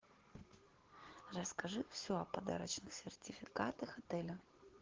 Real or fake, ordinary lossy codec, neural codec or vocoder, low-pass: real; Opus, 16 kbps; none; 7.2 kHz